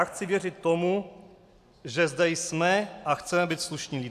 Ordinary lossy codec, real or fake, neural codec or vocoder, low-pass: AAC, 96 kbps; real; none; 14.4 kHz